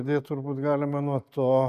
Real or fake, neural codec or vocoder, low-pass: real; none; 14.4 kHz